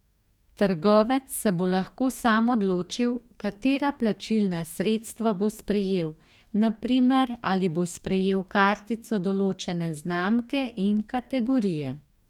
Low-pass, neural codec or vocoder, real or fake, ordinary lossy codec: 19.8 kHz; codec, 44.1 kHz, 2.6 kbps, DAC; fake; none